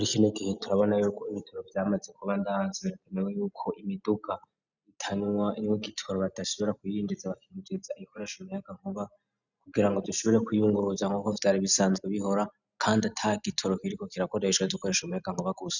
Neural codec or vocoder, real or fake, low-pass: none; real; 7.2 kHz